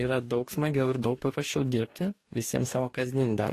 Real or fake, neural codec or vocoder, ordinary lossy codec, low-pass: fake; codec, 44.1 kHz, 2.6 kbps, DAC; AAC, 48 kbps; 14.4 kHz